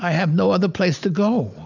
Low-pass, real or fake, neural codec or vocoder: 7.2 kHz; real; none